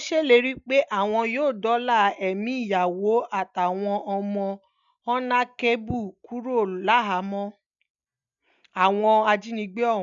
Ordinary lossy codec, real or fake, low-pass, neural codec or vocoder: none; real; 7.2 kHz; none